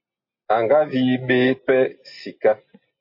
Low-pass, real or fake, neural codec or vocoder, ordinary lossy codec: 5.4 kHz; real; none; MP3, 32 kbps